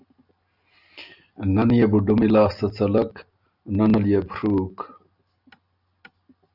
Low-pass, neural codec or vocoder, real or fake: 5.4 kHz; none; real